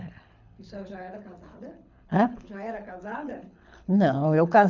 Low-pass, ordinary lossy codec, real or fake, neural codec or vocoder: 7.2 kHz; none; fake; codec, 24 kHz, 6 kbps, HILCodec